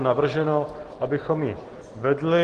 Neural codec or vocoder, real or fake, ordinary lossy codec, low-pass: none; real; Opus, 16 kbps; 9.9 kHz